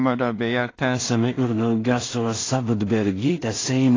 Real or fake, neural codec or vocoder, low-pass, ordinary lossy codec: fake; codec, 16 kHz in and 24 kHz out, 0.4 kbps, LongCat-Audio-Codec, two codebook decoder; 7.2 kHz; AAC, 32 kbps